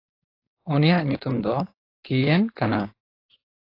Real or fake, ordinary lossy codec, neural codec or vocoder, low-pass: fake; AAC, 32 kbps; codec, 16 kHz, 4.8 kbps, FACodec; 5.4 kHz